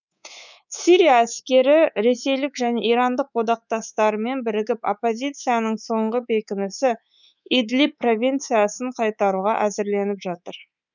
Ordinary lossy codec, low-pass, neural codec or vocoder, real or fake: none; 7.2 kHz; codec, 24 kHz, 3.1 kbps, DualCodec; fake